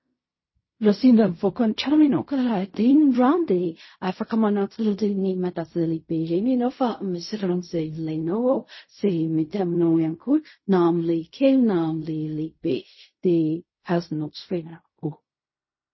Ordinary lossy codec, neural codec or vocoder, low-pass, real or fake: MP3, 24 kbps; codec, 16 kHz in and 24 kHz out, 0.4 kbps, LongCat-Audio-Codec, fine tuned four codebook decoder; 7.2 kHz; fake